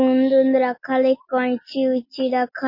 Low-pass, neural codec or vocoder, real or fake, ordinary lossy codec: 5.4 kHz; none; real; MP3, 24 kbps